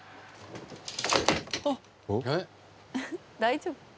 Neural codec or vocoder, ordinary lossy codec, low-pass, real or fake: none; none; none; real